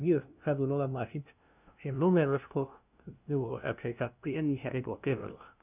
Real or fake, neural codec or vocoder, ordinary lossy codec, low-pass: fake; codec, 16 kHz, 0.5 kbps, FunCodec, trained on LibriTTS, 25 frames a second; none; 3.6 kHz